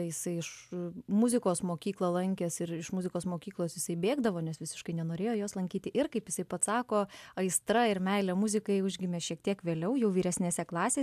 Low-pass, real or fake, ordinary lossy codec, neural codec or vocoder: 14.4 kHz; real; AAC, 96 kbps; none